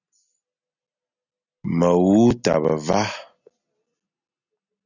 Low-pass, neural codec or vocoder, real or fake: 7.2 kHz; none; real